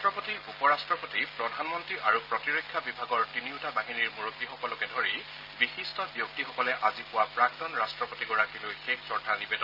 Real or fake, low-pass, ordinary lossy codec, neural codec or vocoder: real; 5.4 kHz; Opus, 24 kbps; none